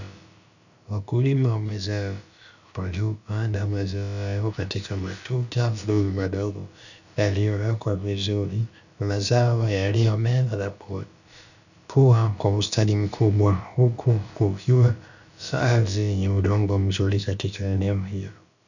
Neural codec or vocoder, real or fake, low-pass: codec, 16 kHz, about 1 kbps, DyCAST, with the encoder's durations; fake; 7.2 kHz